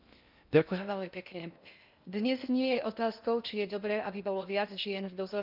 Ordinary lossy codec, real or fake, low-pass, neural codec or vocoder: none; fake; 5.4 kHz; codec, 16 kHz in and 24 kHz out, 0.6 kbps, FocalCodec, streaming, 2048 codes